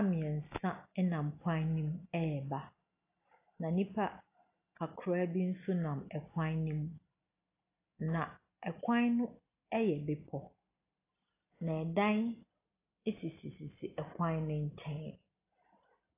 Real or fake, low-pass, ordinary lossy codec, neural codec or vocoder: real; 3.6 kHz; AAC, 24 kbps; none